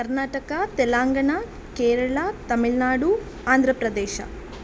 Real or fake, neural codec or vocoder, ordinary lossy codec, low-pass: real; none; none; none